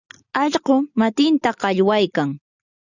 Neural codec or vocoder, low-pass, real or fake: none; 7.2 kHz; real